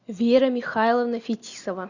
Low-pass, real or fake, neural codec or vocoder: 7.2 kHz; real; none